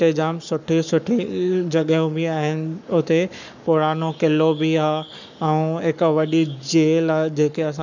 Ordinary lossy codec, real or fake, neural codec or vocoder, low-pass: none; fake; codec, 44.1 kHz, 7.8 kbps, Pupu-Codec; 7.2 kHz